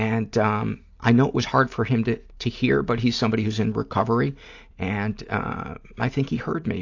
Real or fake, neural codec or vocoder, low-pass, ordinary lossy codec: real; none; 7.2 kHz; AAC, 48 kbps